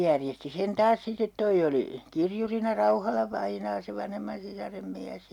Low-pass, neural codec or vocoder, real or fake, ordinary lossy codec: 19.8 kHz; none; real; none